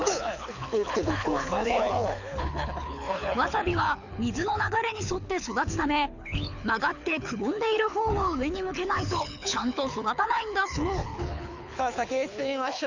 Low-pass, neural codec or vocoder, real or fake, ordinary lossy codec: 7.2 kHz; codec, 24 kHz, 6 kbps, HILCodec; fake; none